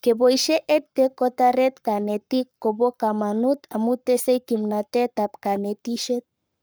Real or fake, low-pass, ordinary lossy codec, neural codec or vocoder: fake; none; none; codec, 44.1 kHz, 7.8 kbps, Pupu-Codec